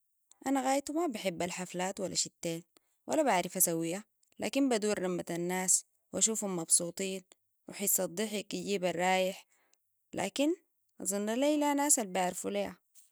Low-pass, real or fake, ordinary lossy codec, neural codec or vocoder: none; real; none; none